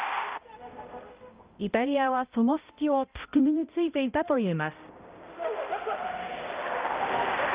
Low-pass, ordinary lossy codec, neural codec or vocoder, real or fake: 3.6 kHz; Opus, 24 kbps; codec, 16 kHz, 0.5 kbps, X-Codec, HuBERT features, trained on balanced general audio; fake